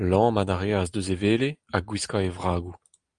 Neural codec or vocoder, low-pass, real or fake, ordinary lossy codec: none; 9.9 kHz; real; Opus, 32 kbps